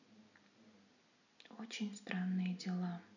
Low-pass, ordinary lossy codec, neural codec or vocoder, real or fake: 7.2 kHz; none; none; real